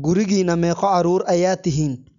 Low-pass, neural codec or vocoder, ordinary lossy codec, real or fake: 7.2 kHz; none; none; real